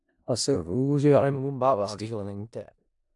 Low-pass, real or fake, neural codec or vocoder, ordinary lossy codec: 10.8 kHz; fake; codec, 16 kHz in and 24 kHz out, 0.4 kbps, LongCat-Audio-Codec, four codebook decoder; AAC, 64 kbps